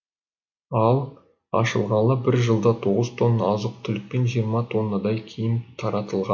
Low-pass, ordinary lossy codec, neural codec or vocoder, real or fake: 7.2 kHz; MP3, 48 kbps; none; real